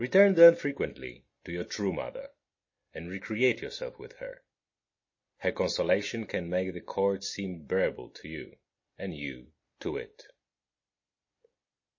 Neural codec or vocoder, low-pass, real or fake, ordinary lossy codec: none; 7.2 kHz; real; MP3, 32 kbps